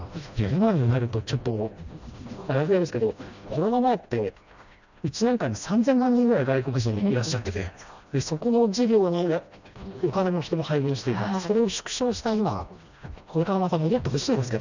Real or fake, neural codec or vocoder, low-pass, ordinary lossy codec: fake; codec, 16 kHz, 1 kbps, FreqCodec, smaller model; 7.2 kHz; none